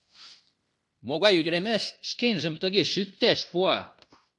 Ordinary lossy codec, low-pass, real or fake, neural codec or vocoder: AAC, 64 kbps; 10.8 kHz; fake; codec, 16 kHz in and 24 kHz out, 0.9 kbps, LongCat-Audio-Codec, fine tuned four codebook decoder